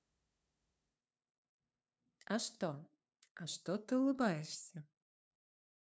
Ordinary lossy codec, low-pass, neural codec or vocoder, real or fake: none; none; codec, 16 kHz, 2 kbps, FunCodec, trained on LibriTTS, 25 frames a second; fake